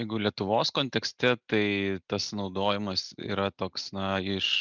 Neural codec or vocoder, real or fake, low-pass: none; real; 7.2 kHz